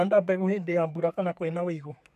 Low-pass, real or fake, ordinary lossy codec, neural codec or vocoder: 14.4 kHz; fake; none; codec, 32 kHz, 1.9 kbps, SNAC